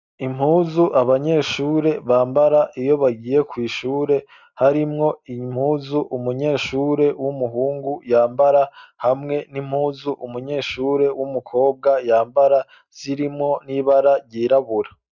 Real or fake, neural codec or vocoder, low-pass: real; none; 7.2 kHz